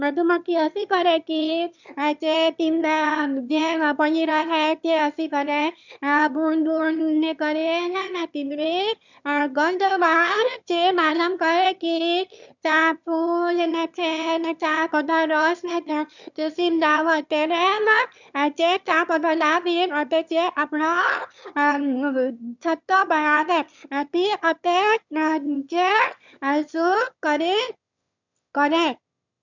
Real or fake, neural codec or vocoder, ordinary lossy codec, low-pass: fake; autoencoder, 22.05 kHz, a latent of 192 numbers a frame, VITS, trained on one speaker; none; 7.2 kHz